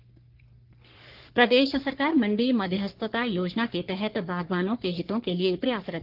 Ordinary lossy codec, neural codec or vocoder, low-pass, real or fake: Opus, 16 kbps; codec, 44.1 kHz, 3.4 kbps, Pupu-Codec; 5.4 kHz; fake